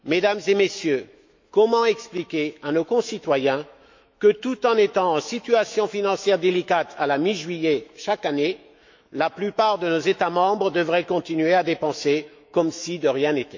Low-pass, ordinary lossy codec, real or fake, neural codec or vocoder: 7.2 kHz; MP3, 48 kbps; fake; autoencoder, 48 kHz, 128 numbers a frame, DAC-VAE, trained on Japanese speech